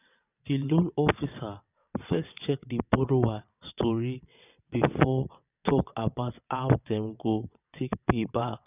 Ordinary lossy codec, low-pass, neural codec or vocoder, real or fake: none; 3.6 kHz; vocoder, 22.05 kHz, 80 mel bands, WaveNeXt; fake